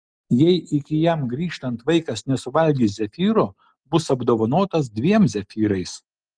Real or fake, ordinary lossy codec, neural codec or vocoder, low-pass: real; Opus, 24 kbps; none; 9.9 kHz